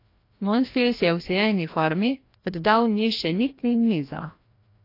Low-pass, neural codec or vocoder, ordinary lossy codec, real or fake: 5.4 kHz; codec, 16 kHz, 1 kbps, FreqCodec, larger model; AAC, 32 kbps; fake